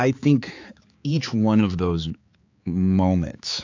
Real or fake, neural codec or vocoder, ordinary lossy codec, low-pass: fake; codec, 16 kHz, 4 kbps, X-Codec, HuBERT features, trained on balanced general audio; AAC, 48 kbps; 7.2 kHz